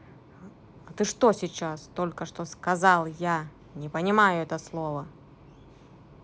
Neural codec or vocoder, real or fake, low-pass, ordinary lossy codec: none; real; none; none